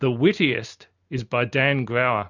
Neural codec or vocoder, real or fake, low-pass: none; real; 7.2 kHz